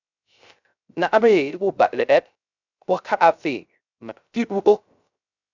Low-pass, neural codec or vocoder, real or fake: 7.2 kHz; codec, 16 kHz, 0.3 kbps, FocalCodec; fake